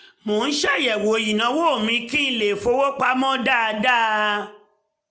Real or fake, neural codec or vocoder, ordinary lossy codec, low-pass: real; none; none; none